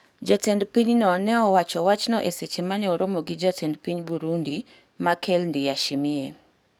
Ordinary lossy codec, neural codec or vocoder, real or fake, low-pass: none; codec, 44.1 kHz, 7.8 kbps, DAC; fake; none